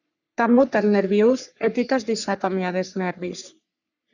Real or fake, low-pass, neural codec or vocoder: fake; 7.2 kHz; codec, 44.1 kHz, 3.4 kbps, Pupu-Codec